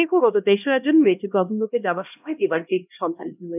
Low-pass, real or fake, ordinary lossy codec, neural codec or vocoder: 3.6 kHz; fake; none; codec, 16 kHz, 1 kbps, X-Codec, HuBERT features, trained on LibriSpeech